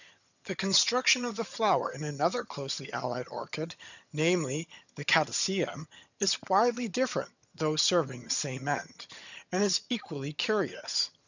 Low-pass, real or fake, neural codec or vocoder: 7.2 kHz; fake; vocoder, 22.05 kHz, 80 mel bands, HiFi-GAN